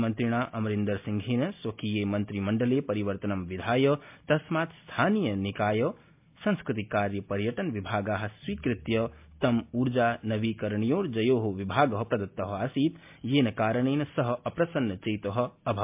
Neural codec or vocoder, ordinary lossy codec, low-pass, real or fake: none; MP3, 32 kbps; 3.6 kHz; real